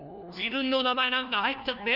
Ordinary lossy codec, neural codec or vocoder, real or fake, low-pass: none; codec, 16 kHz, 1 kbps, FunCodec, trained on LibriTTS, 50 frames a second; fake; 5.4 kHz